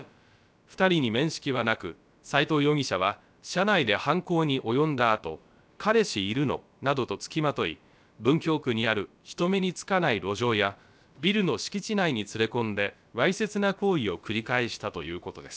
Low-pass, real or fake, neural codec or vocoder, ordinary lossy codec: none; fake; codec, 16 kHz, about 1 kbps, DyCAST, with the encoder's durations; none